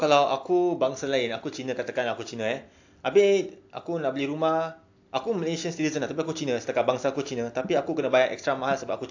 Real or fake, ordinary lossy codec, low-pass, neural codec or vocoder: real; AAC, 48 kbps; 7.2 kHz; none